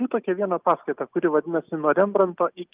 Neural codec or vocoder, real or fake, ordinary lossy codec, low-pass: none; real; Opus, 32 kbps; 3.6 kHz